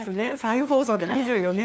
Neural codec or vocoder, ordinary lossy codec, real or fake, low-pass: codec, 16 kHz, 2 kbps, FunCodec, trained on LibriTTS, 25 frames a second; none; fake; none